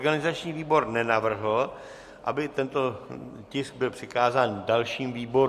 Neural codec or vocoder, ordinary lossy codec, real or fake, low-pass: none; MP3, 64 kbps; real; 14.4 kHz